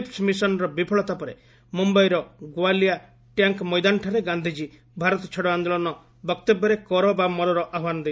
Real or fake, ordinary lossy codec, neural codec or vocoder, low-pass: real; none; none; none